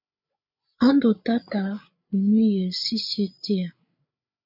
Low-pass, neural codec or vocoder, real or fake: 5.4 kHz; codec, 16 kHz, 8 kbps, FreqCodec, larger model; fake